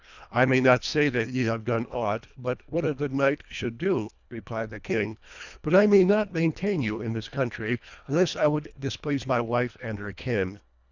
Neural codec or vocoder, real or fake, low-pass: codec, 24 kHz, 1.5 kbps, HILCodec; fake; 7.2 kHz